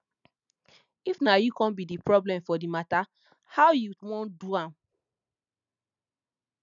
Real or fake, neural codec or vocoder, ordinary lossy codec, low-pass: real; none; none; 7.2 kHz